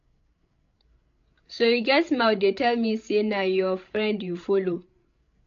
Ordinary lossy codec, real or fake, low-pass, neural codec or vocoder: AAC, 48 kbps; fake; 7.2 kHz; codec, 16 kHz, 16 kbps, FreqCodec, larger model